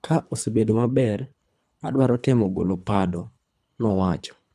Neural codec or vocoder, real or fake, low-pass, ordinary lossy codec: codec, 24 kHz, 3 kbps, HILCodec; fake; none; none